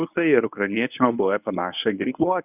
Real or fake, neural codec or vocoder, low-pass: fake; codec, 24 kHz, 0.9 kbps, WavTokenizer, medium speech release version 1; 3.6 kHz